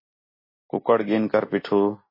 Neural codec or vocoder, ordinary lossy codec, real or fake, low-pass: none; MP3, 24 kbps; real; 5.4 kHz